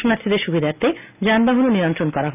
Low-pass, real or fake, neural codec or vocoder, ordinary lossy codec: 3.6 kHz; real; none; none